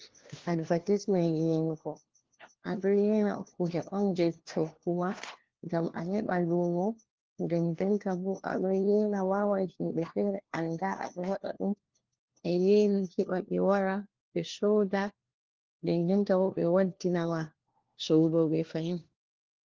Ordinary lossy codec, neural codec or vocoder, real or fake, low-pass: Opus, 16 kbps; codec, 16 kHz, 1 kbps, FunCodec, trained on LibriTTS, 50 frames a second; fake; 7.2 kHz